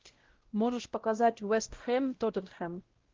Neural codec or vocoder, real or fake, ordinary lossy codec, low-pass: codec, 16 kHz, 0.5 kbps, X-Codec, WavLM features, trained on Multilingual LibriSpeech; fake; Opus, 16 kbps; 7.2 kHz